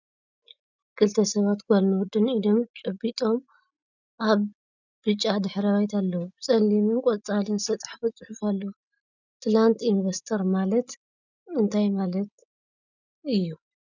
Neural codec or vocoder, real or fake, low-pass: none; real; 7.2 kHz